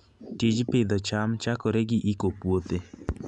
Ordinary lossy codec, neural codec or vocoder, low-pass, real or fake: none; none; 10.8 kHz; real